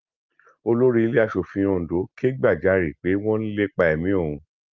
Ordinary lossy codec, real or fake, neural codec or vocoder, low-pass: Opus, 32 kbps; real; none; 7.2 kHz